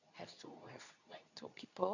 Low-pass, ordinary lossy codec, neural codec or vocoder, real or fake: 7.2 kHz; none; codec, 24 kHz, 0.9 kbps, WavTokenizer, medium speech release version 2; fake